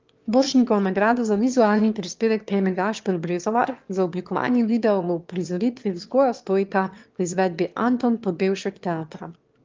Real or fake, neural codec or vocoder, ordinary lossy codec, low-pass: fake; autoencoder, 22.05 kHz, a latent of 192 numbers a frame, VITS, trained on one speaker; Opus, 32 kbps; 7.2 kHz